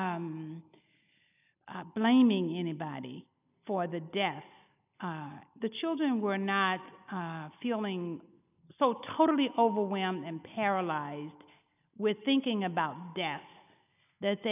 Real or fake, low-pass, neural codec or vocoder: real; 3.6 kHz; none